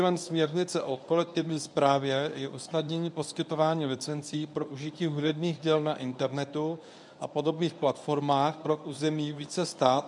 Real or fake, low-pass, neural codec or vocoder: fake; 10.8 kHz; codec, 24 kHz, 0.9 kbps, WavTokenizer, medium speech release version 1